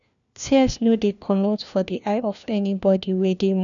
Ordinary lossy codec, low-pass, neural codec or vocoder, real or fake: none; 7.2 kHz; codec, 16 kHz, 1 kbps, FunCodec, trained on LibriTTS, 50 frames a second; fake